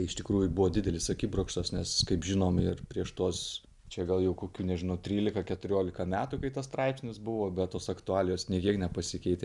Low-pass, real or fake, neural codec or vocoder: 10.8 kHz; real; none